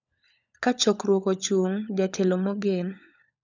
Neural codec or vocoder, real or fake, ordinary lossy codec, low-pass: codec, 16 kHz, 16 kbps, FunCodec, trained on LibriTTS, 50 frames a second; fake; none; 7.2 kHz